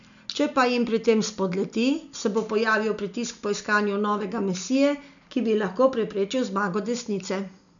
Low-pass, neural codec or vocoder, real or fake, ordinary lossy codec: 7.2 kHz; none; real; none